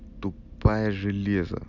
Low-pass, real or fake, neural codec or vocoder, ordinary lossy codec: 7.2 kHz; real; none; none